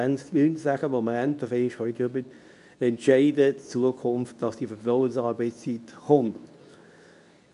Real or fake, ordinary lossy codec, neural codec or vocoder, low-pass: fake; none; codec, 24 kHz, 0.9 kbps, WavTokenizer, medium speech release version 2; 10.8 kHz